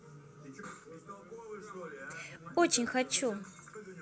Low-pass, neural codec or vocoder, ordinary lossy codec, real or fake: none; none; none; real